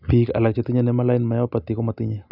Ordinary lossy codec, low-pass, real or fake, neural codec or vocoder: none; 5.4 kHz; real; none